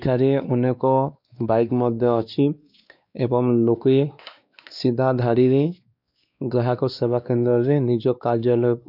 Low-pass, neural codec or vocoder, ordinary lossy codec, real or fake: 5.4 kHz; codec, 16 kHz, 2 kbps, X-Codec, WavLM features, trained on Multilingual LibriSpeech; none; fake